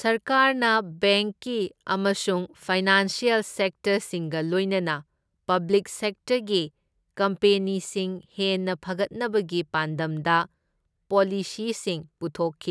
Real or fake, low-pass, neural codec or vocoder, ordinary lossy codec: real; none; none; none